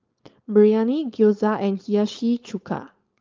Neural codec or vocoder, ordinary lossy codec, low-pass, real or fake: codec, 16 kHz, 4.8 kbps, FACodec; Opus, 32 kbps; 7.2 kHz; fake